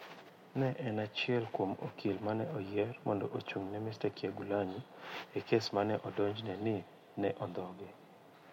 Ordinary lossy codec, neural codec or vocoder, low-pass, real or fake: MP3, 64 kbps; none; 19.8 kHz; real